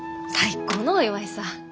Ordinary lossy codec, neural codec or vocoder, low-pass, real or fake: none; none; none; real